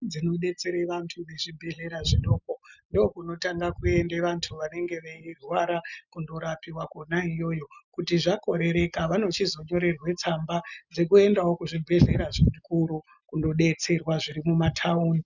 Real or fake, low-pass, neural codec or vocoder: real; 7.2 kHz; none